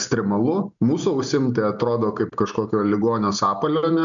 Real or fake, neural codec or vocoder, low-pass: real; none; 7.2 kHz